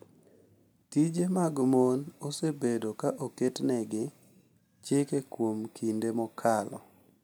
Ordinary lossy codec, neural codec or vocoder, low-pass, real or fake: none; none; none; real